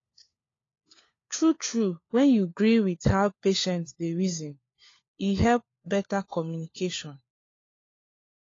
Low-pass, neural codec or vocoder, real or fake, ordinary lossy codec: 7.2 kHz; codec, 16 kHz, 4 kbps, FunCodec, trained on LibriTTS, 50 frames a second; fake; AAC, 32 kbps